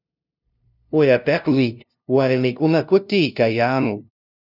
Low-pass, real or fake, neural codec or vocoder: 5.4 kHz; fake; codec, 16 kHz, 0.5 kbps, FunCodec, trained on LibriTTS, 25 frames a second